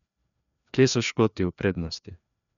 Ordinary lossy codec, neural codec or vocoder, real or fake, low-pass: none; codec, 16 kHz, 2 kbps, FreqCodec, larger model; fake; 7.2 kHz